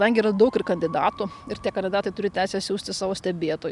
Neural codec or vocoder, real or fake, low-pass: none; real; 10.8 kHz